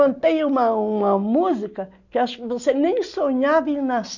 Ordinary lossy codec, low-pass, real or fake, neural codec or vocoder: none; 7.2 kHz; real; none